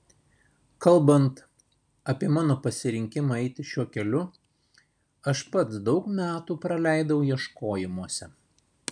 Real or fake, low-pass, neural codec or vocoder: real; 9.9 kHz; none